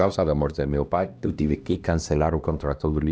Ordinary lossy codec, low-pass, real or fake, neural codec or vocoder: none; none; fake; codec, 16 kHz, 1 kbps, X-Codec, HuBERT features, trained on LibriSpeech